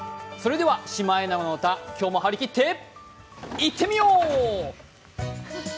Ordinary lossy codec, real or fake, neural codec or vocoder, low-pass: none; real; none; none